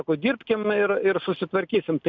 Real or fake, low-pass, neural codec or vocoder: real; 7.2 kHz; none